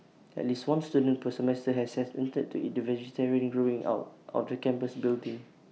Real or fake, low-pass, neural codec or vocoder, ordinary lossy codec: real; none; none; none